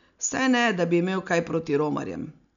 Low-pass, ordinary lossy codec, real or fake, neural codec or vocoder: 7.2 kHz; none; real; none